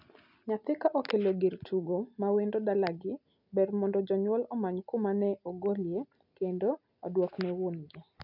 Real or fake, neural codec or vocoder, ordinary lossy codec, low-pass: real; none; none; 5.4 kHz